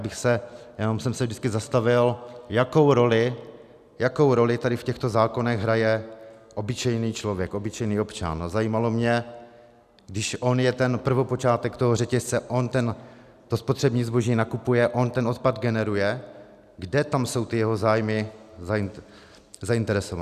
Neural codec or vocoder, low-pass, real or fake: none; 14.4 kHz; real